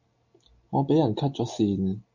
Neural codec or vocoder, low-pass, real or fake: none; 7.2 kHz; real